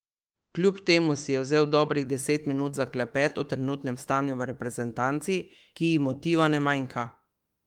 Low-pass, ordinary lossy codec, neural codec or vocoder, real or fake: 19.8 kHz; Opus, 24 kbps; autoencoder, 48 kHz, 32 numbers a frame, DAC-VAE, trained on Japanese speech; fake